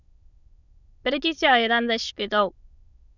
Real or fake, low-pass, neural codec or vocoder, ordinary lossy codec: fake; 7.2 kHz; autoencoder, 22.05 kHz, a latent of 192 numbers a frame, VITS, trained on many speakers; none